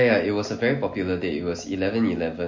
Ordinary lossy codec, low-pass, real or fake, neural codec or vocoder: MP3, 32 kbps; 7.2 kHz; real; none